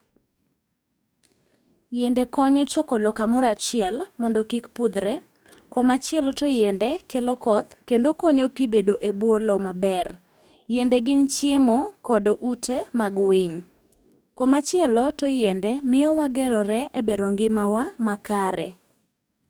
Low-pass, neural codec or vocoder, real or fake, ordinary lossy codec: none; codec, 44.1 kHz, 2.6 kbps, DAC; fake; none